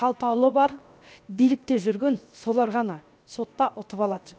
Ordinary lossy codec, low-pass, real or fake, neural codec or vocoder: none; none; fake; codec, 16 kHz, 0.7 kbps, FocalCodec